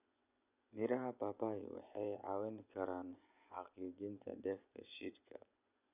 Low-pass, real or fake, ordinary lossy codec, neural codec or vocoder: 3.6 kHz; fake; none; vocoder, 24 kHz, 100 mel bands, Vocos